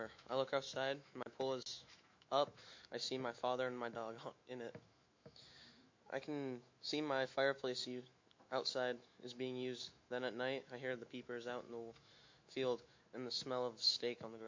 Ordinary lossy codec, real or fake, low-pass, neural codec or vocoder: MP3, 48 kbps; fake; 7.2 kHz; autoencoder, 48 kHz, 128 numbers a frame, DAC-VAE, trained on Japanese speech